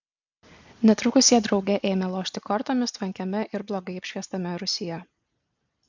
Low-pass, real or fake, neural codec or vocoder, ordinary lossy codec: 7.2 kHz; real; none; MP3, 64 kbps